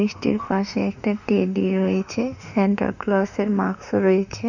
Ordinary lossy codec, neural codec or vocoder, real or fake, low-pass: none; autoencoder, 48 kHz, 32 numbers a frame, DAC-VAE, trained on Japanese speech; fake; 7.2 kHz